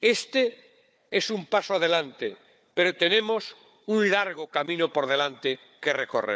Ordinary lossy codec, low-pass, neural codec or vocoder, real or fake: none; none; codec, 16 kHz, 4 kbps, FunCodec, trained on Chinese and English, 50 frames a second; fake